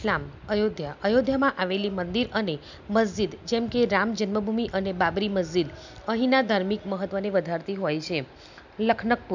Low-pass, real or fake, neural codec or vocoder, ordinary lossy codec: 7.2 kHz; real; none; none